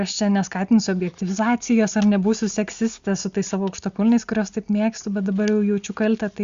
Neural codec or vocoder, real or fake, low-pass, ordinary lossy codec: none; real; 7.2 kHz; Opus, 64 kbps